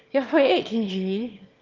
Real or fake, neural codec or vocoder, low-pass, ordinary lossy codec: fake; autoencoder, 22.05 kHz, a latent of 192 numbers a frame, VITS, trained on one speaker; 7.2 kHz; Opus, 24 kbps